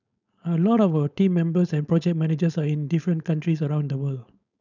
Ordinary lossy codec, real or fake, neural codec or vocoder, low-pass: none; fake; codec, 16 kHz, 4.8 kbps, FACodec; 7.2 kHz